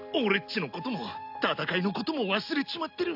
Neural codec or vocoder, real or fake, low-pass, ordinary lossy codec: none; real; 5.4 kHz; AAC, 48 kbps